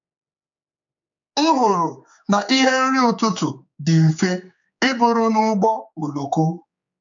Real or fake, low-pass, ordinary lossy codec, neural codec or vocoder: fake; 7.2 kHz; MP3, 64 kbps; codec, 16 kHz, 4 kbps, X-Codec, HuBERT features, trained on general audio